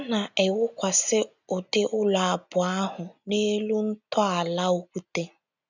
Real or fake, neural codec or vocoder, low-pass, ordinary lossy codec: real; none; 7.2 kHz; none